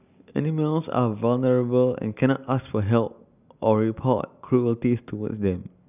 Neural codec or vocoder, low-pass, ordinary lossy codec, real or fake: none; 3.6 kHz; none; real